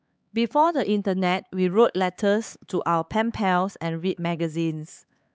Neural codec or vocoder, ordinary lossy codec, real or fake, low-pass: codec, 16 kHz, 4 kbps, X-Codec, HuBERT features, trained on LibriSpeech; none; fake; none